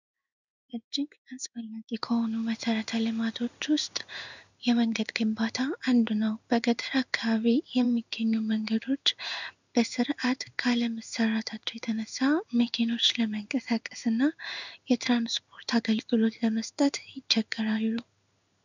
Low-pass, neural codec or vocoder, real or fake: 7.2 kHz; codec, 16 kHz in and 24 kHz out, 1 kbps, XY-Tokenizer; fake